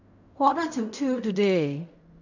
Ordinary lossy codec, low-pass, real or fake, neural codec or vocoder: none; 7.2 kHz; fake; codec, 16 kHz in and 24 kHz out, 0.4 kbps, LongCat-Audio-Codec, fine tuned four codebook decoder